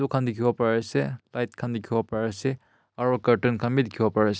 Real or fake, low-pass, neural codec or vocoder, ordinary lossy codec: real; none; none; none